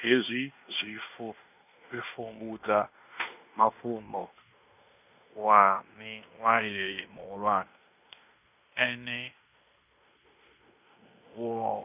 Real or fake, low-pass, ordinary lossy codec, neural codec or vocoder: fake; 3.6 kHz; none; codec, 16 kHz in and 24 kHz out, 0.9 kbps, LongCat-Audio-Codec, fine tuned four codebook decoder